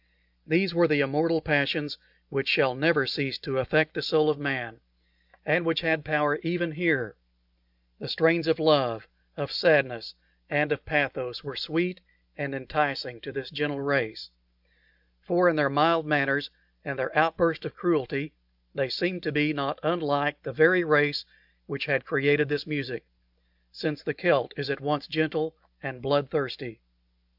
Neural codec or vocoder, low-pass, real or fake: none; 5.4 kHz; real